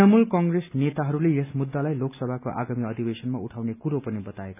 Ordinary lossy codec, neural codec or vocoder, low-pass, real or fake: none; none; 3.6 kHz; real